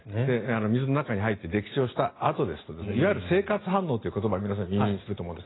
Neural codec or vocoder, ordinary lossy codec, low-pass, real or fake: none; AAC, 16 kbps; 7.2 kHz; real